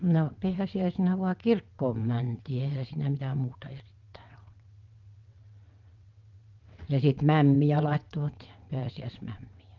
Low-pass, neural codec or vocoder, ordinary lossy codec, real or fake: 7.2 kHz; vocoder, 22.05 kHz, 80 mel bands, WaveNeXt; Opus, 24 kbps; fake